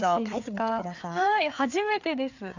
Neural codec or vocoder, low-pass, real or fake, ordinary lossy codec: codec, 16 kHz, 4 kbps, FreqCodec, larger model; 7.2 kHz; fake; none